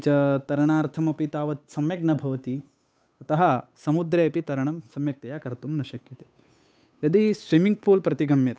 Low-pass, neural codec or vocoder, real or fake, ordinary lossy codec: none; codec, 16 kHz, 8 kbps, FunCodec, trained on Chinese and English, 25 frames a second; fake; none